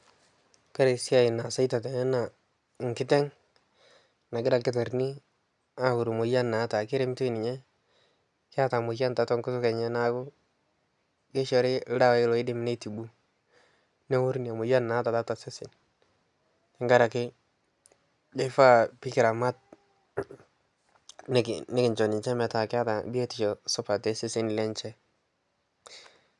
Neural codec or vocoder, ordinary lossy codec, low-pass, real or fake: none; none; 10.8 kHz; real